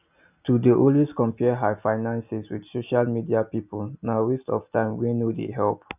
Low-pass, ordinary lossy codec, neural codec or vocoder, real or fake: 3.6 kHz; none; none; real